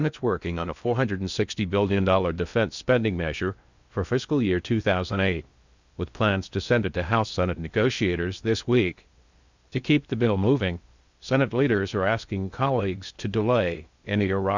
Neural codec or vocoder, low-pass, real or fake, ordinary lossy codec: codec, 16 kHz in and 24 kHz out, 0.6 kbps, FocalCodec, streaming, 4096 codes; 7.2 kHz; fake; Opus, 64 kbps